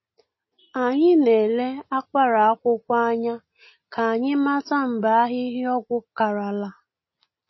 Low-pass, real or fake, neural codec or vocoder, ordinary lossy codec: 7.2 kHz; real; none; MP3, 24 kbps